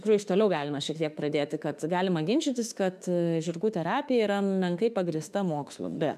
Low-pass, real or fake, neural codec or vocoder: 14.4 kHz; fake; autoencoder, 48 kHz, 32 numbers a frame, DAC-VAE, trained on Japanese speech